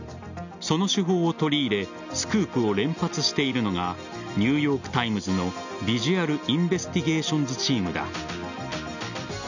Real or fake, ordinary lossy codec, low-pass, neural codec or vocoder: real; none; 7.2 kHz; none